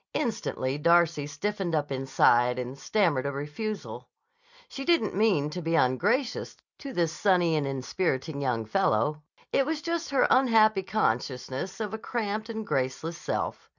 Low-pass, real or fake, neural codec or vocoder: 7.2 kHz; real; none